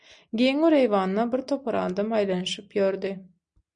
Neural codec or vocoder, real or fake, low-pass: none; real; 9.9 kHz